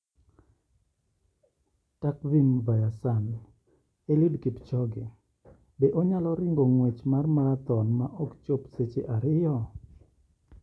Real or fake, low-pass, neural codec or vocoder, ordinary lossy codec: real; none; none; none